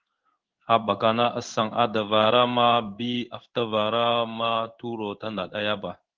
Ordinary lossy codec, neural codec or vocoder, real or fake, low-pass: Opus, 16 kbps; codec, 16 kHz in and 24 kHz out, 1 kbps, XY-Tokenizer; fake; 7.2 kHz